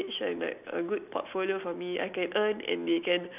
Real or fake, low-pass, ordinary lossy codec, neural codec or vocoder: real; 3.6 kHz; none; none